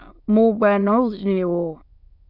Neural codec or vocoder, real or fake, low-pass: autoencoder, 22.05 kHz, a latent of 192 numbers a frame, VITS, trained on many speakers; fake; 5.4 kHz